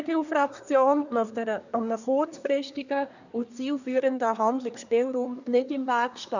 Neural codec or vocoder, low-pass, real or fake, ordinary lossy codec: codec, 24 kHz, 1 kbps, SNAC; 7.2 kHz; fake; none